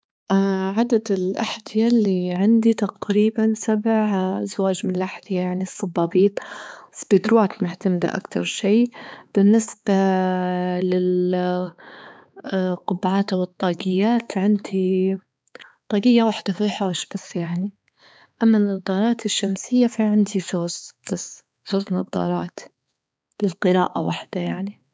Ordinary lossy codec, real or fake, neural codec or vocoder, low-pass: none; fake; codec, 16 kHz, 4 kbps, X-Codec, HuBERT features, trained on balanced general audio; none